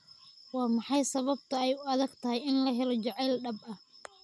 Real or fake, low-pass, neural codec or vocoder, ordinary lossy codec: real; none; none; none